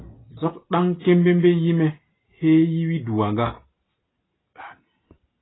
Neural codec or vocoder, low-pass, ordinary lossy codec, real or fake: none; 7.2 kHz; AAC, 16 kbps; real